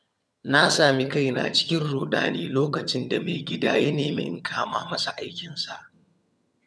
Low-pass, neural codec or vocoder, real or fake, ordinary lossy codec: none; vocoder, 22.05 kHz, 80 mel bands, HiFi-GAN; fake; none